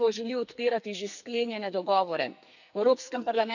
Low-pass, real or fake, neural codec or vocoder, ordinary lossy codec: 7.2 kHz; fake; codec, 44.1 kHz, 2.6 kbps, SNAC; none